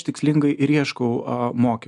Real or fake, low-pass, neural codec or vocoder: real; 10.8 kHz; none